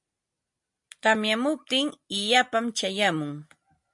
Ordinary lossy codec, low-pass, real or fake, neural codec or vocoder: MP3, 48 kbps; 10.8 kHz; real; none